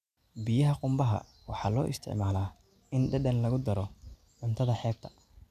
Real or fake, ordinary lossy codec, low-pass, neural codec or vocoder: real; none; 14.4 kHz; none